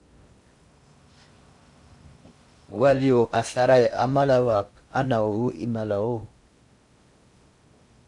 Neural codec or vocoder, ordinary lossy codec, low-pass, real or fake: codec, 16 kHz in and 24 kHz out, 0.6 kbps, FocalCodec, streaming, 2048 codes; MP3, 64 kbps; 10.8 kHz; fake